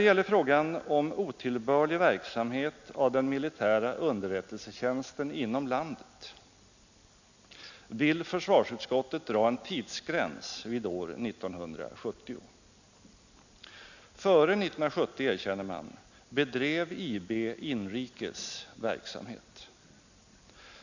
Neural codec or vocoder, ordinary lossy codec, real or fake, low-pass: none; none; real; 7.2 kHz